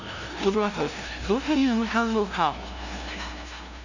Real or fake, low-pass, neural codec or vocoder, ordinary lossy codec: fake; 7.2 kHz; codec, 16 kHz, 0.5 kbps, FunCodec, trained on LibriTTS, 25 frames a second; none